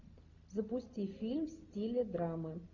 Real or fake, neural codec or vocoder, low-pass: real; none; 7.2 kHz